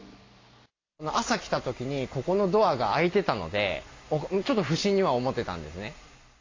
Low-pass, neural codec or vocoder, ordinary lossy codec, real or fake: 7.2 kHz; none; AAC, 32 kbps; real